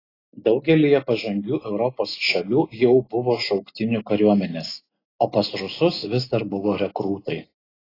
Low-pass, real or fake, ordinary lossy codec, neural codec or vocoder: 5.4 kHz; real; AAC, 24 kbps; none